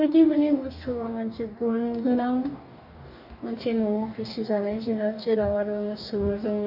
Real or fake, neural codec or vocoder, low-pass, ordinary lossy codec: fake; codec, 44.1 kHz, 2.6 kbps, DAC; 5.4 kHz; none